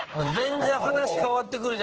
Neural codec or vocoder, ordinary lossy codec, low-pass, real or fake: codec, 16 kHz in and 24 kHz out, 1 kbps, XY-Tokenizer; Opus, 16 kbps; 7.2 kHz; fake